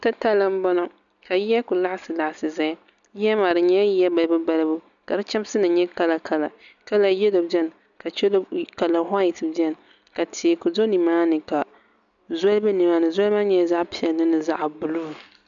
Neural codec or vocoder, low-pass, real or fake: none; 7.2 kHz; real